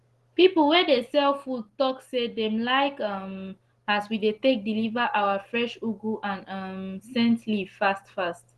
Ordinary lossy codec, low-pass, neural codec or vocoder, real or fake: Opus, 16 kbps; 10.8 kHz; none; real